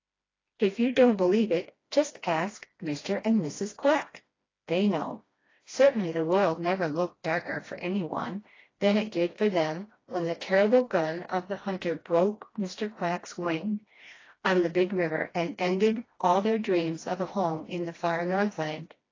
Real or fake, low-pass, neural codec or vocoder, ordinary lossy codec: fake; 7.2 kHz; codec, 16 kHz, 1 kbps, FreqCodec, smaller model; AAC, 32 kbps